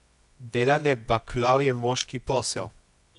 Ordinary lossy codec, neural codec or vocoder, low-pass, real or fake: AAC, 96 kbps; codec, 24 kHz, 0.9 kbps, WavTokenizer, medium music audio release; 10.8 kHz; fake